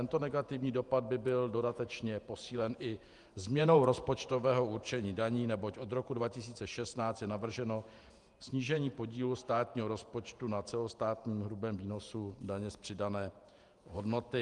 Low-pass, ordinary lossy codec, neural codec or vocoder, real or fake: 10.8 kHz; Opus, 32 kbps; vocoder, 48 kHz, 128 mel bands, Vocos; fake